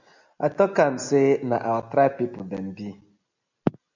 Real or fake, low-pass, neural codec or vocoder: real; 7.2 kHz; none